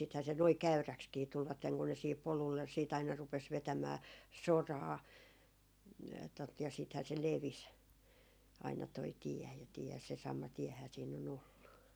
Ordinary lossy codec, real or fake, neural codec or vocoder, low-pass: none; real; none; none